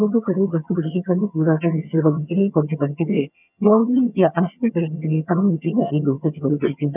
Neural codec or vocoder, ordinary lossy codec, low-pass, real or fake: vocoder, 22.05 kHz, 80 mel bands, HiFi-GAN; none; 3.6 kHz; fake